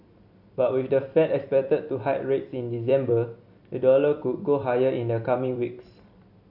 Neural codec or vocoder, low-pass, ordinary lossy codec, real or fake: vocoder, 44.1 kHz, 128 mel bands every 256 samples, BigVGAN v2; 5.4 kHz; AAC, 48 kbps; fake